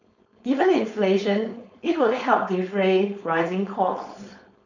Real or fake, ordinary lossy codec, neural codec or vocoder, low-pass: fake; none; codec, 16 kHz, 4.8 kbps, FACodec; 7.2 kHz